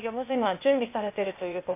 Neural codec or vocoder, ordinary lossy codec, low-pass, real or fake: codec, 16 kHz, 0.8 kbps, ZipCodec; MP3, 24 kbps; 3.6 kHz; fake